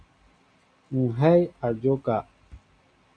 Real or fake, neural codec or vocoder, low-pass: real; none; 9.9 kHz